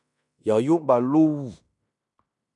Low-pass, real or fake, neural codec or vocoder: 10.8 kHz; fake; codec, 16 kHz in and 24 kHz out, 0.9 kbps, LongCat-Audio-Codec, fine tuned four codebook decoder